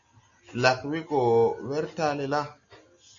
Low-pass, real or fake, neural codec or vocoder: 7.2 kHz; real; none